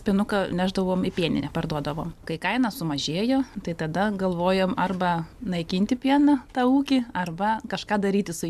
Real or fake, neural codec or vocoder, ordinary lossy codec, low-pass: real; none; MP3, 96 kbps; 14.4 kHz